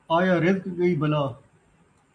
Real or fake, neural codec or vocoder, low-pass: real; none; 9.9 kHz